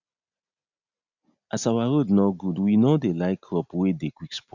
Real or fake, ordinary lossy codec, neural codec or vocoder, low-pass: real; none; none; 7.2 kHz